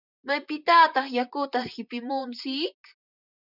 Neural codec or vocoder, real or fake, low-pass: vocoder, 44.1 kHz, 128 mel bands, Pupu-Vocoder; fake; 5.4 kHz